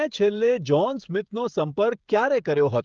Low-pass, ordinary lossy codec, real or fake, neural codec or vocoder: 7.2 kHz; Opus, 24 kbps; fake; codec, 16 kHz, 16 kbps, FreqCodec, smaller model